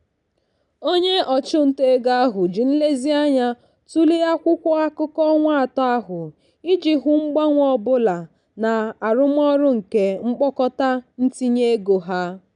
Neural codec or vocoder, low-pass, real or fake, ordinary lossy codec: none; 10.8 kHz; real; none